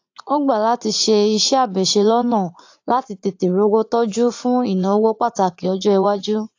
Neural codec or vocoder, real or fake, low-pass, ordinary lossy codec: vocoder, 44.1 kHz, 80 mel bands, Vocos; fake; 7.2 kHz; AAC, 48 kbps